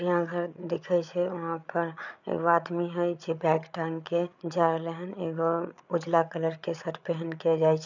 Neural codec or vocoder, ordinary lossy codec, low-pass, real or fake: codec, 16 kHz, 8 kbps, FreqCodec, larger model; none; 7.2 kHz; fake